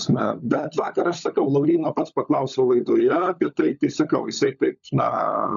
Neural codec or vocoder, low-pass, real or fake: codec, 16 kHz, 16 kbps, FunCodec, trained on LibriTTS, 50 frames a second; 7.2 kHz; fake